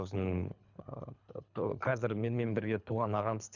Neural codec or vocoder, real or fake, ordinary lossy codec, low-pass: codec, 24 kHz, 3 kbps, HILCodec; fake; none; 7.2 kHz